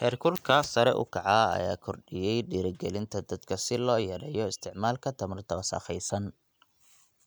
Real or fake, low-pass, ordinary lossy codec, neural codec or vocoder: real; none; none; none